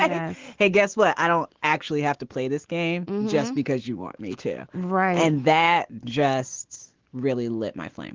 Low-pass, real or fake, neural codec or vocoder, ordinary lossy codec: 7.2 kHz; fake; autoencoder, 48 kHz, 128 numbers a frame, DAC-VAE, trained on Japanese speech; Opus, 16 kbps